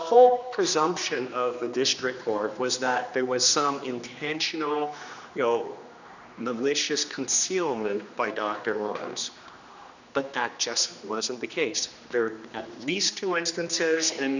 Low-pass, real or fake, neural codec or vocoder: 7.2 kHz; fake; codec, 16 kHz, 2 kbps, X-Codec, HuBERT features, trained on general audio